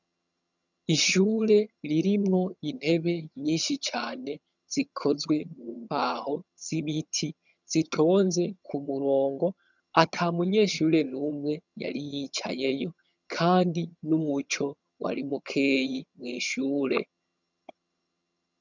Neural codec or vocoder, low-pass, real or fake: vocoder, 22.05 kHz, 80 mel bands, HiFi-GAN; 7.2 kHz; fake